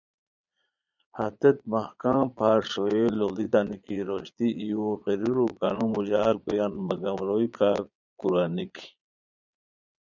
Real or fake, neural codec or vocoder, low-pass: fake; vocoder, 22.05 kHz, 80 mel bands, Vocos; 7.2 kHz